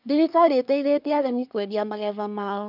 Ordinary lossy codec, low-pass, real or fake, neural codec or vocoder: none; 5.4 kHz; fake; codec, 24 kHz, 1 kbps, SNAC